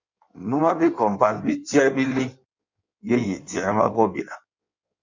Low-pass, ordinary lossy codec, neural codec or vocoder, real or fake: 7.2 kHz; MP3, 64 kbps; codec, 16 kHz in and 24 kHz out, 1.1 kbps, FireRedTTS-2 codec; fake